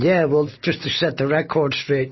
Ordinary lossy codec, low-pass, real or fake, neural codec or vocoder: MP3, 24 kbps; 7.2 kHz; real; none